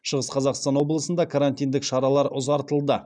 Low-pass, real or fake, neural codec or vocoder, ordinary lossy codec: 9.9 kHz; real; none; none